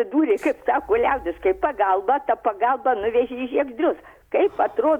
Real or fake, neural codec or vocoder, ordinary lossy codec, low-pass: real; none; Opus, 64 kbps; 19.8 kHz